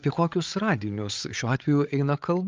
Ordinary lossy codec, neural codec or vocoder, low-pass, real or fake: Opus, 24 kbps; codec, 16 kHz, 8 kbps, FreqCodec, larger model; 7.2 kHz; fake